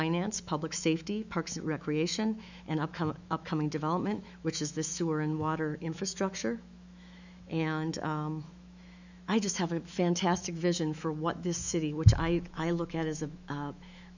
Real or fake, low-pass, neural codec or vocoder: fake; 7.2 kHz; autoencoder, 48 kHz, 128 numbers a frame, DAC-VAE, trained on Japanese speech